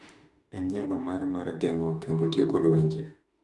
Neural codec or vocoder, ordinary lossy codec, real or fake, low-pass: autoencoder, 48 kHz, 32 numbers a frame, DAC-VAE, trained on Japanese speech; Opus, 64 kbps; fake; 10.8 kHz